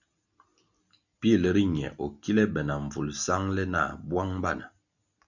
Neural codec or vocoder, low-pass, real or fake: none; 7.2 kHz; real